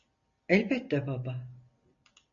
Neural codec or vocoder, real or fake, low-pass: none; real; 7.2 kHz